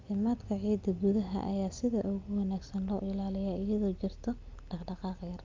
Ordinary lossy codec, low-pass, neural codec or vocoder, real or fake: Opus, 24 kbps; 7.2 kHz; none; real